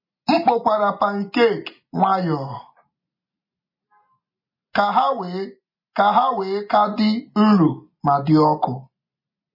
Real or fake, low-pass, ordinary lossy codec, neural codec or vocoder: real; 5.4 kHz; MP3, 24 kbps; none